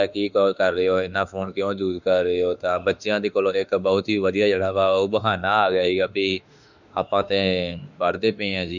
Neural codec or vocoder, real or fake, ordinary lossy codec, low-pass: autoencoder, 48 kHz, 32 numbers a frame, DAC-VAE, trained on Japanese speech; fake; none; 7.2 kHz